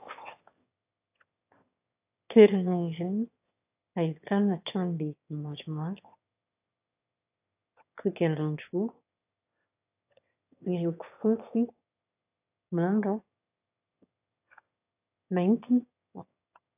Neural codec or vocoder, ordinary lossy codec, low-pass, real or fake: autoencoder, 22.05 kHz, a latent of 192 numbers a frame, VITS, trained on one speaker; AAC, 32 kbps; 3.6 kHz; fake